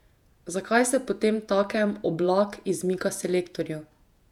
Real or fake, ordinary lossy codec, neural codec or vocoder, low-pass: fake; none; vocoder, 48 kHz, 128 mel bands, Vocos; 19.8 kHz